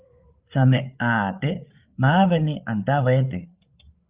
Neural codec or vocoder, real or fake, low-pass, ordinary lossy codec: codec, 16 kHz, 8 kbps, FreqCodec, larger model; fake; 3.6 kHz; Opus, 24 kbps